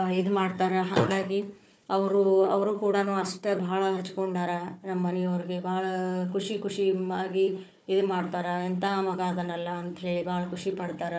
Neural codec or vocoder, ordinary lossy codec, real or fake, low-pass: codec, 16 kHz, 4 kbps, FunCodec, trained on Chinese and English, 50 frames a second; none; fake; none